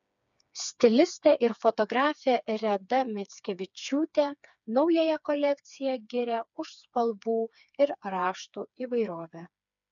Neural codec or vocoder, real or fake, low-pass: codec, 16 kHz, 4 kbps, FreqCodec, smaller model; fake; 7.2 kHz